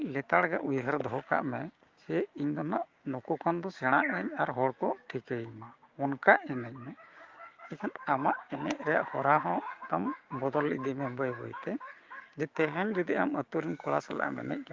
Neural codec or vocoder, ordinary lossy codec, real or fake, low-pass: codec, 16 kHz, 6 kbps, DAC; Opus, 32 kbps; fake; 7.2 kHz